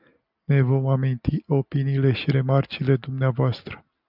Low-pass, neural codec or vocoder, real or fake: 5.4 kHz; none; real